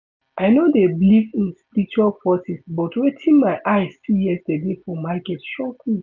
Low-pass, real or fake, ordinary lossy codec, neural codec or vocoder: 7.2 kHz; real; none; none